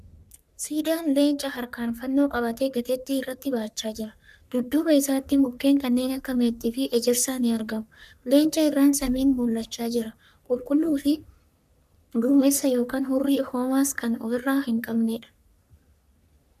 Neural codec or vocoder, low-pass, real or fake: codec, 44.1 kHz, 3.4 kbps, Pupu-Codec; 14.4 kHz; fake